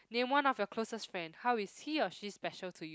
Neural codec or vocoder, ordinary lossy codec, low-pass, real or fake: none; none; none; real